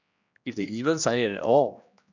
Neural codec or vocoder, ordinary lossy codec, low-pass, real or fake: codec, 16 kHz, 1 kbps, X-Codec, HuBERT features, trained on general audio; none; 7.2 kHz; fake